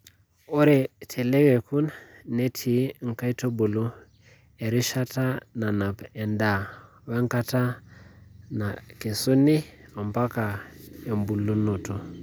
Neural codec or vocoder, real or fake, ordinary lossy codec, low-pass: none; real; none; none